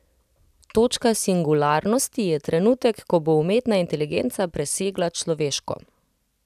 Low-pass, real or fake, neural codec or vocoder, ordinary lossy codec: 14.4 kHz; real; none; none